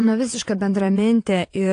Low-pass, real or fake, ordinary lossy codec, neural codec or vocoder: 9.9 kHz; fake; AAC, 48 kbps; vocoder, 22.05 kHz, 80 mel bands, WaveNeXt